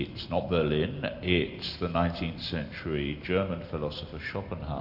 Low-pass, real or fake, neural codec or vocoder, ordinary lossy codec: 5.4 kHz; real; none; AAC, 48 kbps